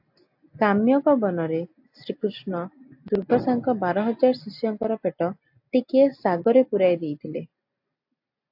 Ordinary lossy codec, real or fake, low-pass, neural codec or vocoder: AAC, 48 kbps; real; 5.4 kHz; none